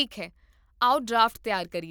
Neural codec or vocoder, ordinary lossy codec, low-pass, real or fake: none; none; none; real